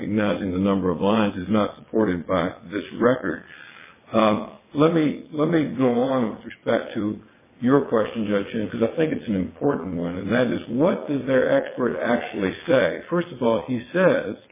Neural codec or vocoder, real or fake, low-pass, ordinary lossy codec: vocoder, 22.05 kHz, 80 mel bands, WaveNeXt; fake; 3.6 kHz; MP3, 16 kbps